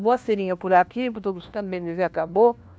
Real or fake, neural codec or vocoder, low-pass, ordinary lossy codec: fake; codec, 16 kHz, 1 kbps, FunCodec, trained on LibriTTS, 50 frames a second; none; none